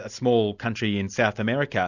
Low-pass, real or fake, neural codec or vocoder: 7.2 kHz; real; none